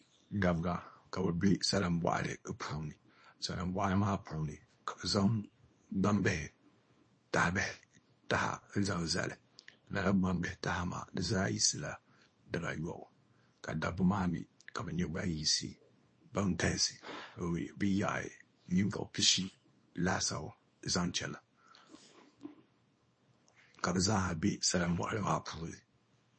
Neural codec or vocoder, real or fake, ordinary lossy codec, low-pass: codec, 24 kHz, 0.9 kbps, WavTokenizer, small release; fake; MP3, 32 kbps; 9.9 kHz